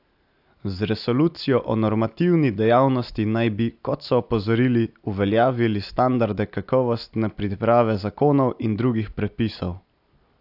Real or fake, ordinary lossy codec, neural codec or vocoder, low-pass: real; AAC, 48 kbps; none; 5.4 kHz